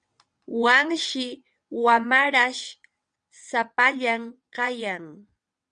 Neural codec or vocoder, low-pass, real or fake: vocoder, 22.05 kHz, 80 mel bands, WaveNeXt; 9.9 kHz; fake